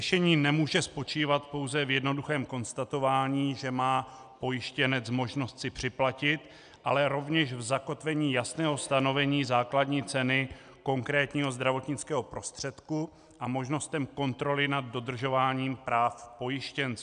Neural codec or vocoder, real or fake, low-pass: none; real; 9.9 kHz